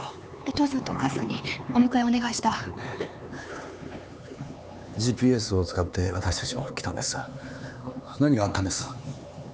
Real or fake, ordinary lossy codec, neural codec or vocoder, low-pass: fake; none; codec, 16 kHz, 4 kbps, X-Codec, HuBERT features, trained on LibriSpeech; none